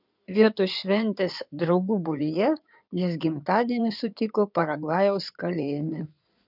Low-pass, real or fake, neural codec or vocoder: 5.4 kHz; fake; codec, 16 kHz in and 24 kHz out, 2.2 kbps, FireRedTTS-2 codec